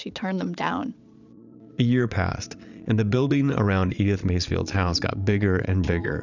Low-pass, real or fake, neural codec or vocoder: 7.2 kHz; fake; vocoder, 22.05 kHz, 80 mel bands, Vocos